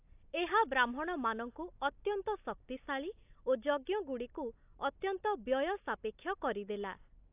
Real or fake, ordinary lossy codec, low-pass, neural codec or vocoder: real; AAC, 32 kbps; 3.6 kHz; none